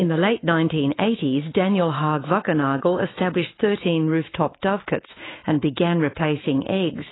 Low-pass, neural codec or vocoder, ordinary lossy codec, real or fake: 7.2 kHz; autoencoder, 48 kHz, 128 numbers a frame, DAC-VAE, trained on Japanese speech; AAC, 16 kbps; fake